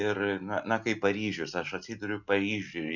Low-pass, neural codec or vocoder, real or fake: 7.2 kHz; none; real